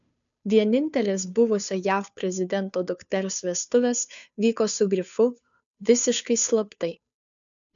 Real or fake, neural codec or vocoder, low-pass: fake; codec, 16 kHz, 2 kbps, FunCodec, trained on Chinese and English, 25 frames a second; 7.2 kHz